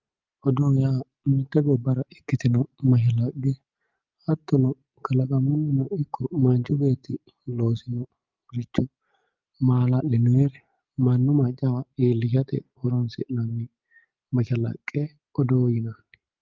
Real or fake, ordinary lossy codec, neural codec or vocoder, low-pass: real; Opus, 24 kbps; none; 7.2 kHz